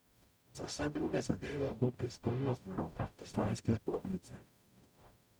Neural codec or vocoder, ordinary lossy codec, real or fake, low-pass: codec, 44.1 kHz, 0.9 kbps, DAC; none; fake; none